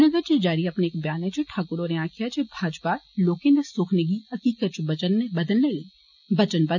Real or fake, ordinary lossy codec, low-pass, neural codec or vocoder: real; none; 7.2 kHz; none